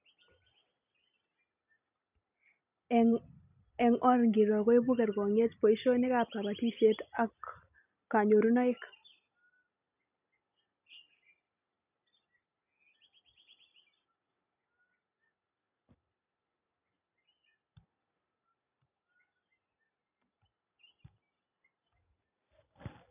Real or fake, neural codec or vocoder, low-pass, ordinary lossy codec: real; none; 3.6 kHz; none